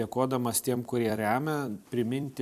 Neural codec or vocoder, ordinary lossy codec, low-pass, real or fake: vocoder, 44.1 kHz, 128 mel bands every 256 samples, BigVGAN v2; AAC, 96 kbps; 14.4 kHz; fake